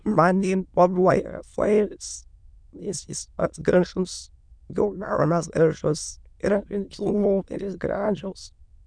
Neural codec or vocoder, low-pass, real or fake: autoencoder, 22.05 kHz, a latent of 192 numbers a frame, VITS, trained on many speakers; 9.9 kHz; fake